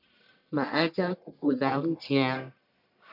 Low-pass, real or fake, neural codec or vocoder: 5.4 kHz; fake; codec, 44.1 kHz, 1.7 kbps, Pupu-Codec